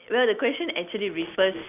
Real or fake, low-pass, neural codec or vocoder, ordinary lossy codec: real; 3.6 kHz; none; none